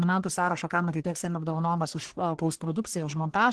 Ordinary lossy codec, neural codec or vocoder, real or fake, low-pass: Opus, 16 kbps; codec, 44.1 kHz, 1.7 kbps, Pupu-Codec; fake; 10.8 kHz